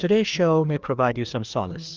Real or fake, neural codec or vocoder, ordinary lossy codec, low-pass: fake; codec, 16 kHz, 2 kbps, FreqCodec, larger model; Opus, 24 kbps; 7.2 kHz